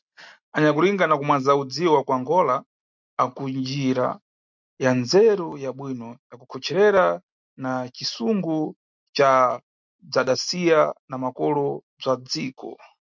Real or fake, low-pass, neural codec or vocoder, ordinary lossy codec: real; 7.2 kHz; none; MP3, 64 kbps